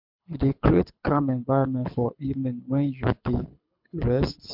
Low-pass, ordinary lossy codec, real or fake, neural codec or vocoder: 5.4 kHz; MP3, 48 kbps; fake; codec, 24 kHz, 6 kbps, HILCodec